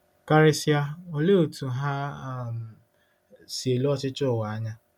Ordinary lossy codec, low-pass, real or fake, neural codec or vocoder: none; 19.8 kHz; real; none